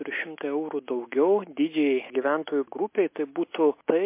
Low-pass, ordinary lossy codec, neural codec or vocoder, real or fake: 3.6 kHz; MP3, 24 kbps; none; real